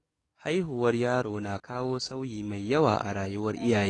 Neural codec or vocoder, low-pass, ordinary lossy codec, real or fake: codec, 44.1 kHz, 7.8 kbps, DAC; 10.8 kHz; AAC, 32 kbps; fake